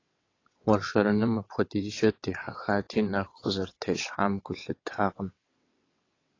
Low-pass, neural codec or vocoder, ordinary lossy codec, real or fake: 7.2 kHz; vocoder, 22.05 kHz, 80 mel bands, WaveNeXt; AAC, 32 kbps; fake